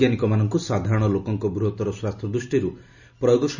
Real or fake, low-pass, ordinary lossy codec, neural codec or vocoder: real; 7.2 kHz; none; none